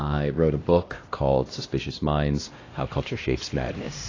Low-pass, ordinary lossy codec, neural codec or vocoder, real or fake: 7.2 kHz; AAC, 32 kbps; codec, 16 kHz, 1 kbps, X-Codec, HuBERT features, trained on LibriSpeech; fake